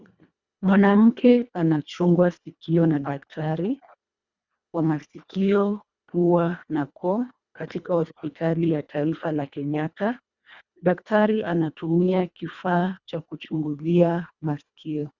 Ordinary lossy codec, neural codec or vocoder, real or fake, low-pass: Opus, 64 kbps; codec, 24 kHz, 1.5 kbps, HILCodec; fake; 7.2 kHz